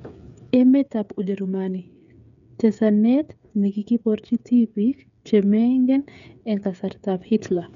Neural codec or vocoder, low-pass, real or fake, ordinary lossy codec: codec, 16 kHz, 8 kbps, FreqCodec, smaller model; 7.2 kHz; fake; none